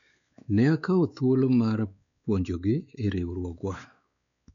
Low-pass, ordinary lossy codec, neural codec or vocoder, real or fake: 7.2 kHz; none; codec, 16 kHz, 4 kbps, X-Codec, WavLM features, trained on Multilingual LibriSpeech; fake